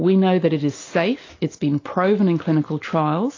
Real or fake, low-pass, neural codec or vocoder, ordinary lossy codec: real; 7.2 kHz; none; AAC, 32 kbps